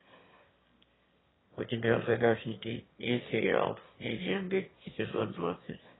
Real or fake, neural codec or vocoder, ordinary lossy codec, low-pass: fake; autoencoder, 22.05 kHz, a latent of 192 numbers a frame, VITS, trained on one speaker; AAC, 16 kbps; 7.2 kHz